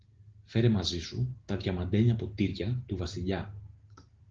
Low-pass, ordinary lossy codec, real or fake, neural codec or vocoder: 7.2 kHz; Opus, 16 kbps; real; none